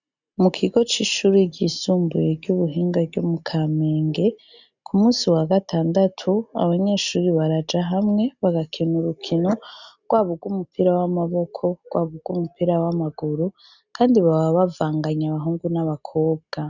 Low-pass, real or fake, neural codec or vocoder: 7.2 kHz; real; none